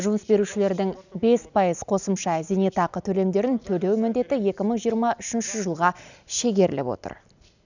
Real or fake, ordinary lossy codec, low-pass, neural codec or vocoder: real; none; 7.2 kHz; none